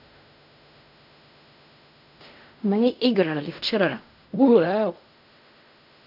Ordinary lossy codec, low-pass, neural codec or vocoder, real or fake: none; 5.4 kHz; codec, 16 kHz in and 24 kHz out, 0.4 kbps, LongCat-Audio-Codec, fine tuned four codebook decoder; fake